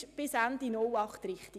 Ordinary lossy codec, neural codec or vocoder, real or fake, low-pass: none; none; real; 14.4 kHz